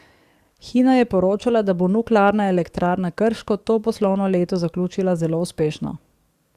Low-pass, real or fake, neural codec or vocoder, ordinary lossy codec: 14.4 kHz; fake; codec, 44.1 kHz, 7.8 kbps, DAC; Opus, 64 kbps